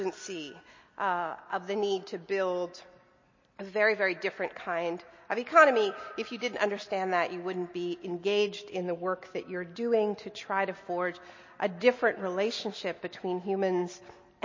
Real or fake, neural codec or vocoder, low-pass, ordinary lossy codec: real; none; 7.2 kHz; MP3, 32 kbps